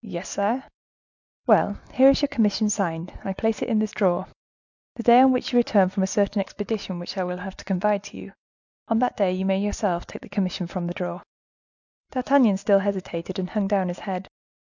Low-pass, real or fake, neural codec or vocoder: 7.2 kHz; real; none